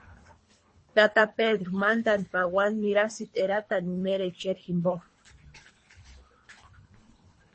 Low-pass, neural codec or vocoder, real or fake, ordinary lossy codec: 9.9 kHz; codec, 24 kHz, 3 kbps, HILCodec; fake; MP3, 32 kbps